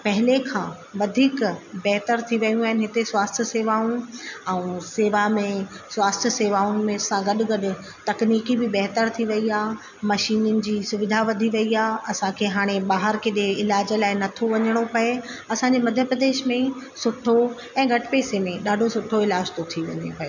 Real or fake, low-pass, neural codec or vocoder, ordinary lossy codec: real; 7.2 kHz; none; none